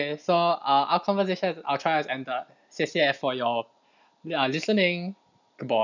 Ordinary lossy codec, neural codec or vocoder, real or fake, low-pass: none; none; real; 7.2 kHz